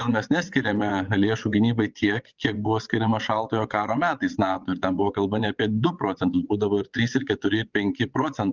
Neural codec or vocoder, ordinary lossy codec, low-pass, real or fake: vocoder, 22.05 kHz, 80 mel bands, WaveNeXt; Opus, 32 kbps; 7.2 kHz; fake